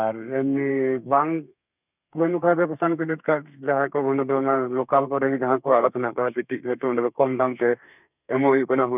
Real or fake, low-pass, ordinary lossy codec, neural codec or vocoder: fake; 3.6 kHz; none; codec, 32 kHz, 1.9 kbps, SNAC